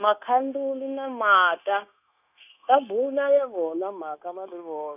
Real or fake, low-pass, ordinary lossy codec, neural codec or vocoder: fake; 3.6 kHz; none; codec, 16 kHz, 0.9 kbps, LongCat-Audio-Codec